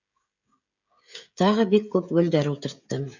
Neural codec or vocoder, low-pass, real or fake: codec, 16 kHz, 16 kbps, FreqCodec, smaller model; 7.2 kHz; fake